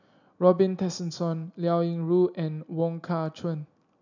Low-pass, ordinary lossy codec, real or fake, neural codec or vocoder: 7.2 kHz; none; real; none